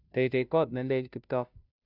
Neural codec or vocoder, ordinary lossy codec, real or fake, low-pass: codec, 16 kHz, 0.5 kbps, FunCodec, trained on Chinese and English, 25 frames a second; none; fake; 5.4 kHz